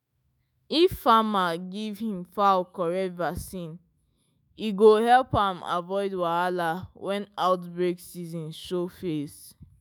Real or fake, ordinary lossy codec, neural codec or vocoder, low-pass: fake; none; autoencoder, 48 kHz, 128 numbers a frame, DAC-VAE, trained on Japanese speech; none